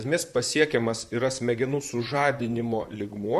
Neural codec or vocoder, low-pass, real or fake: vocoder, 44.1 kHz, 128 mel bands, Pupu-Vocoder; 14.4 kHz; fake